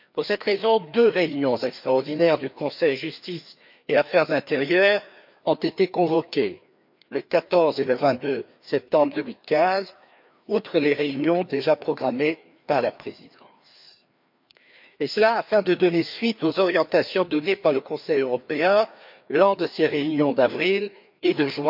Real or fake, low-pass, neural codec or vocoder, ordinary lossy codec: fake; 5.4 kHz; codec, 16 kHz, 2 kbps, FreqCodec, larger model; MP3, 48 kbps